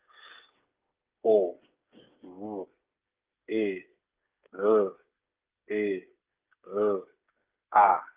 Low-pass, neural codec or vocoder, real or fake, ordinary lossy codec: 3.6 kHz; codec, 16 kHz, 8 kbps, FreqCodec, smaller model; fake; Opus, 32 kbps